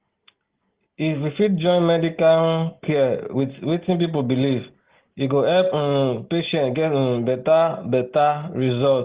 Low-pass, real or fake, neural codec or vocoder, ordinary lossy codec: 3.6 kHz; real; none; Opus, 16 kbps